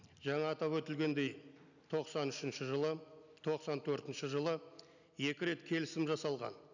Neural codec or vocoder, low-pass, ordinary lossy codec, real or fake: none; 7.2 kHz; none; real